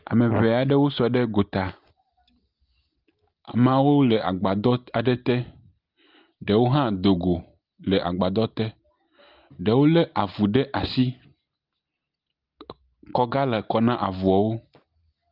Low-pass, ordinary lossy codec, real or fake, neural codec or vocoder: 5.4 kHz; Opus, 24 kbps; real; none